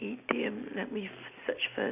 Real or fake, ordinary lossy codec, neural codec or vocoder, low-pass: real; none; none; 3.6 kHz